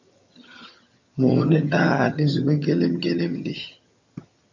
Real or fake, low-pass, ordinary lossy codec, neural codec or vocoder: fake; 7.2 kHz; MP3, 48 kbps; vocoder, 22.05 kHz, 80 mel bands, HiFi-GAN